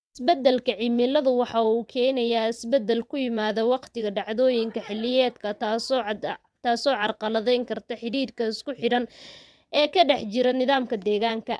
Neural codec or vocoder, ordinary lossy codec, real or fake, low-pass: vocoder, 22.05 kHz, 80 mel bands, WaveNeXt; none; fake; none